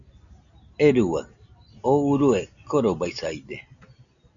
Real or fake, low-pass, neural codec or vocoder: real; 7.2 kHz; none